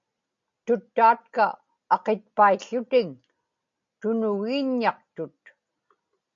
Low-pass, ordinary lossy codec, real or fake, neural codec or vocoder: 7.2 kHz; MP3, 64 kbps; real; none